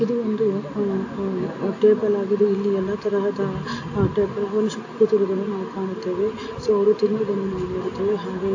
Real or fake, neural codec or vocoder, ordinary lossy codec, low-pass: real; none; AAC, 48 kbps; 7.2 kHz